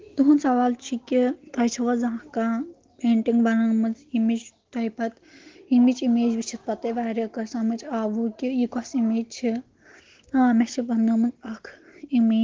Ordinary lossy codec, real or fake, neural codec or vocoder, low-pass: Opus, 16 kbps; real; none; 7.2 kHz